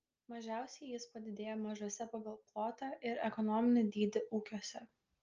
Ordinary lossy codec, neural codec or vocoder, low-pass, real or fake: Opus, 24 kbps; none; 7.2 kHz; real